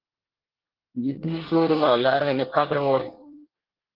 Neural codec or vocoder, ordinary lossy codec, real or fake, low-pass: codec, 24 kHz, 1 kbps, SNAC; Opus, 16 kbps; fake; 5.4 kHz